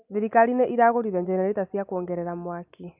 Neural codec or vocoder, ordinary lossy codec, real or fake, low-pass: none; none; real; 3.6 kHz